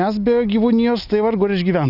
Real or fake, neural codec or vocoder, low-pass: real; none; 5.4 kHz